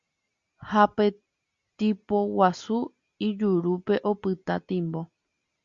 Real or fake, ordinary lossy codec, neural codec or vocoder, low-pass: real; Opus, 64 kbps; none; 7.2 kHz